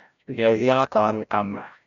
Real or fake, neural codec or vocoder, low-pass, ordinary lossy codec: fake; codec, 16 kHz, 0.5 kbps, FreqCodec, larger model; 7.2 kHz; none